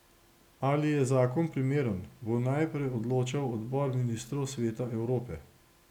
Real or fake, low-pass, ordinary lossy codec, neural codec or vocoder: real; 19.8 kHz; none; none